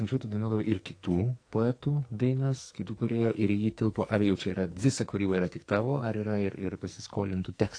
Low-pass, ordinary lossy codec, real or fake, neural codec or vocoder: 9.9 kHz; AAC, 48 kbps; fake; codec, 44.1 kHz, 2.6 kbps, SNAC